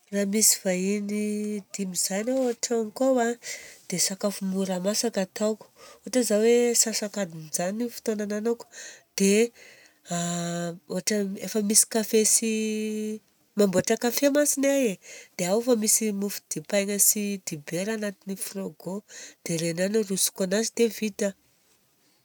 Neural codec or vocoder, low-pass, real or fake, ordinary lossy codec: none; none; real; none